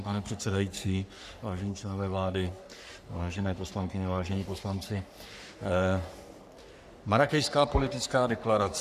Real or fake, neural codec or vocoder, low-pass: fake; codec, 44.1 kHz, 3.4 kbps, Pupu-Codec; 14.4 kHz